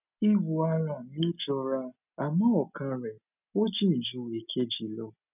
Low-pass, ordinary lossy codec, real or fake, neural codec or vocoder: 3.6 kHz; none; real; none